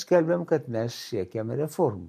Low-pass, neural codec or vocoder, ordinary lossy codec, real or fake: 14.4 kHz; none; MP3, 64 kbps; real